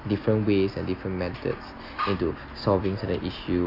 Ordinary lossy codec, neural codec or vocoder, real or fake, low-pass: AAC, 32 kbps; none; real; 5.4 kHz